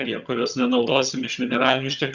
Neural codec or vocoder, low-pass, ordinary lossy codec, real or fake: vocoder, 22.05 kHz, 80 mel bands, HiFi-GAN; 7.2 kHz; Opus, 64 kbps; fake